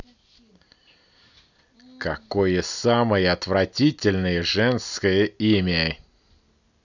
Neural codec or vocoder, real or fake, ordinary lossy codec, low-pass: none; real; none; 7.2 kHz